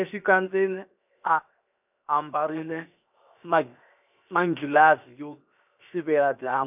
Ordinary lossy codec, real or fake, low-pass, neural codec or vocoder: none; fake; 3.6 kHz; codec, 16 kHz, 0.7 kbps, FocalCodec